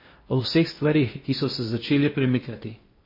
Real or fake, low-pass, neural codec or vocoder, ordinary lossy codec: fake; 5.4 kHz; codec, 16 kHz in and 24 kHz out, 0.6 kbps, FocalCodec, streaming, 4096 codes; MP3, 24 kbps